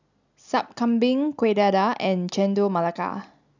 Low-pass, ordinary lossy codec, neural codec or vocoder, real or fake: 7.2 kHz; none; none; real